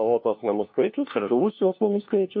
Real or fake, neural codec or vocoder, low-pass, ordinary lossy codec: fake; codec, 16 kHz, 1 kbps, FunCodec, trained on LibriTTS, 50 frames a second; 7.2 kHz; MP3, 32 kbps